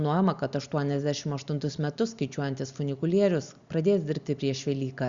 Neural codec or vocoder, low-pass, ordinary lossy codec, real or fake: none; 7.2 kHz; Opus, 64 kbps; real